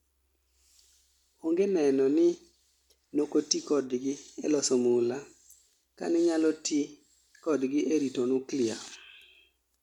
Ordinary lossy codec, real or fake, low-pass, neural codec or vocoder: none; real; 19.8 kHz; none